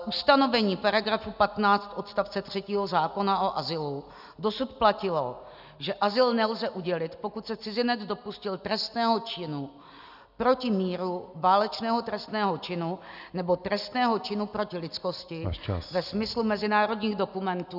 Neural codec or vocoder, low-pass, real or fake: none; 5.4 kHz; real